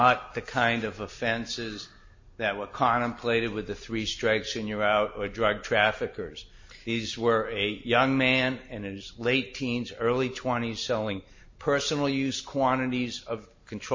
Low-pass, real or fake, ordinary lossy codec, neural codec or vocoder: 7.2 kHz; real; MP3, 32 kbps; none